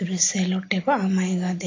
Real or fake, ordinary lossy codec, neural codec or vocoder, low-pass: real; AAC, 32 kbps; none; 7.2 kHz